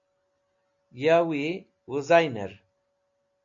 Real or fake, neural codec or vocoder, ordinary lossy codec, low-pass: real; none; AAC, 48 kbps; 7.2 kHz